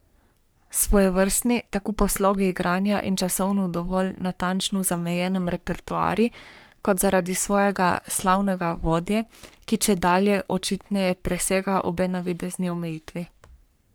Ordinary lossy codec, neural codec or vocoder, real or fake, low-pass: none; codec, 44.1 kHz, 3.4 kbps, Pupu-Codec; fake; none